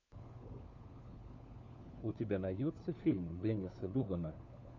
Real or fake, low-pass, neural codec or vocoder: fake; 7.2 kHz; codec, 16 kHz, 4 kbps, FunCodec, trained on LibriTTS, 50 frames a second